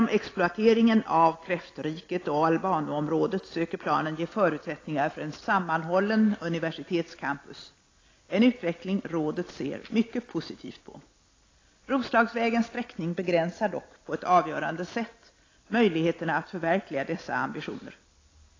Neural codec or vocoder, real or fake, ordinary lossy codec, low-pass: none; real; AAC, 32 kbps; 7.2 kHz